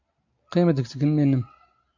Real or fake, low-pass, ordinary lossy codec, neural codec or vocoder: real; 7.2 kHz; AAC, 48 kbps; none